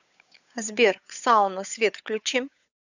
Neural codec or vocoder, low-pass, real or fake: codec, 16 kHz, 8 kbps, FunCodec, trained on Chinese and English, 25 frames a second; 7.2 kHz; fake